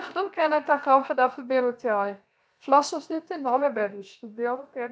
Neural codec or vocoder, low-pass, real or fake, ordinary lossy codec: codec, 16 kHz, 0.7 kbps, FocalCodec; none; fake; none